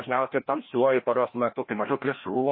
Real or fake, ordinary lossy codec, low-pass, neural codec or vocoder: fake; MP3, 24 kbps; 5.4 kHz; codec, 16 kHz, 1 kbps, FreqCodec, larger model